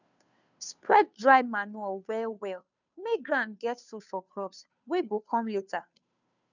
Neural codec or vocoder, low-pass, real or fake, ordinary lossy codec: codec, 16 kHz, 2 kbps, FunCodec, trained on Chinese and English, 25 frames a second; 7.2 kHz; fake; none